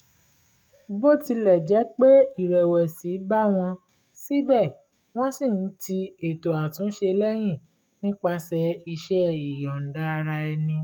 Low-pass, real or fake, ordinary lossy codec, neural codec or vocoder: 19.8 kHz; fake; none; codec, 44.1 kHz, 7.8 kbps, DAC